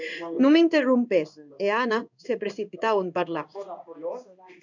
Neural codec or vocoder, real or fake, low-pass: codec, 16 kHz in and 24 kHz out, 1 kbps, XY-Tokenizer; fake; 7.2 kHz